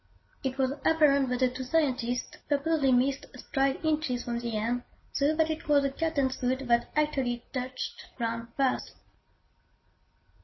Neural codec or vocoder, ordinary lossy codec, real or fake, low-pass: none; MP3, 24 kbps; real; 7.2 kHz